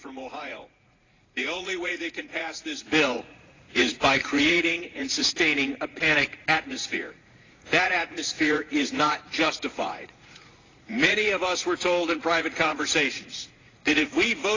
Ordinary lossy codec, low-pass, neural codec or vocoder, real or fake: AAC, 32 kbps; 7.2 kHz; vocoder, 44.1 kHz, 80 mel bands, Vocos; fake